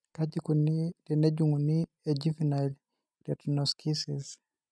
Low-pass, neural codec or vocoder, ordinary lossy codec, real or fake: none; none; none; real